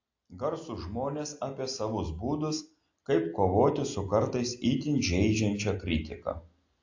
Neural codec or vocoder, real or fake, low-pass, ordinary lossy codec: none; real; 7.2 kHz; AAC, 48 kbps